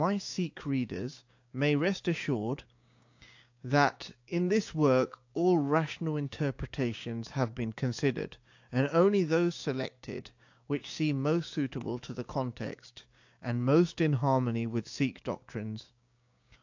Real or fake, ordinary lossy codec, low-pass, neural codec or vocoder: fake; AAC, 48 kbps; 7.2 kHz; codec, 16 kHz, 6 kbps, DAC